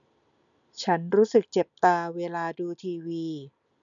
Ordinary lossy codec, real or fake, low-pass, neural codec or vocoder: none; real; 7.2 kHz; none